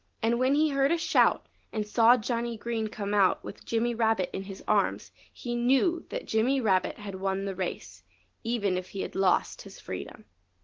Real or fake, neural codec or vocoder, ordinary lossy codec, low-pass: real; none; Opus, 16 kbps; 7.2 kHz